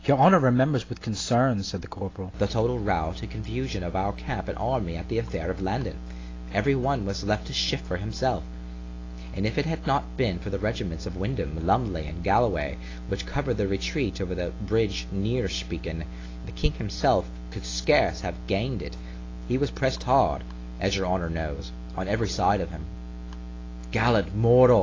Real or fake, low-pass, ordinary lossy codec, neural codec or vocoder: real; 7.2 kHz; AAC, 32 kbps; none